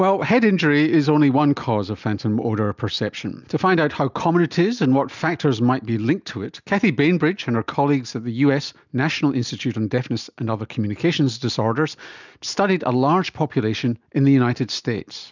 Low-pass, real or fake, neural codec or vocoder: 7.2 kHz; real; none